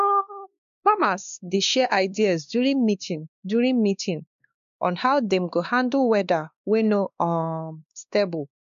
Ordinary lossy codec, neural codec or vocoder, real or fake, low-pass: none; codec, 16 kHz, 2 kbps, X-Codec, WavLM features, trained on Multilingual LibriSpeech; fake; 7.2 kHz